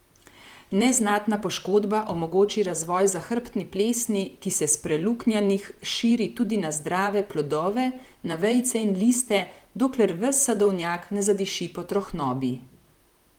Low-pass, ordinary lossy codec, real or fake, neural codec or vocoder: 19.8 kHz; Opus, 32 kbps; fake; vocoder, 44.1 kHz, 128 mel bands, Pupu-Vocoder